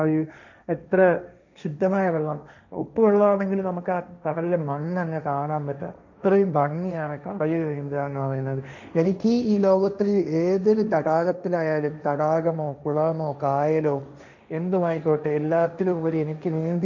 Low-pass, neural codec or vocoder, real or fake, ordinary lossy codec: 7.2 kHz; codec, 16 kHz, 1.1 kbps, Voila-Tokenizer; fake; none